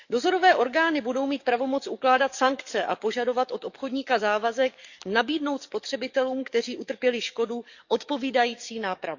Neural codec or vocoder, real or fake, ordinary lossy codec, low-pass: codec, 44.1 kHz, 7.8 kbps, DAC; fake; none; 7.2 kHz